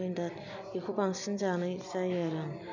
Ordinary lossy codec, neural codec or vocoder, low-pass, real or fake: none; none; 7.2 kHz; real